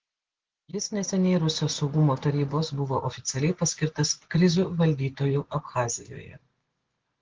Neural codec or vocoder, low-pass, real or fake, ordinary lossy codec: none; 7.2 kHz; real; Opus, 16 kbps